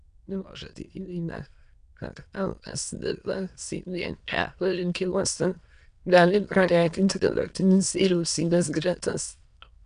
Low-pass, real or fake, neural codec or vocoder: 9.9 kHz; fake; autoencoder, 22.05 kHz, a latent of 192 numbers a frame, VITS, trained on many speakers